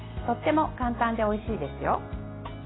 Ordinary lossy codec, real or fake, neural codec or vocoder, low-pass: AAC, 16 kbps; real; none; 7.2 kHz